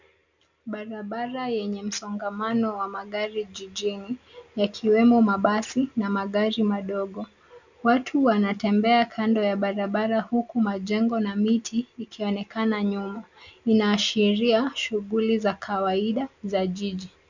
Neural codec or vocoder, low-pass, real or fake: none; 7.2 kHz; real